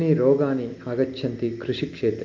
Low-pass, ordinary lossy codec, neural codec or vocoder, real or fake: 7.2 kHz; Opus, 24 kbps; none; real